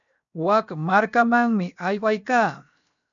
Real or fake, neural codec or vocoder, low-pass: fake; codec, 16 kHz, 0.7 kbps, FocalCodec; 7.2 kHz